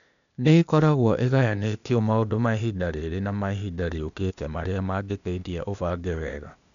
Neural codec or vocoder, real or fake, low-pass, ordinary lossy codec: codec, 16 kHz, 0.8 kbps, ZipCodec; fake; 7.2 kHz; none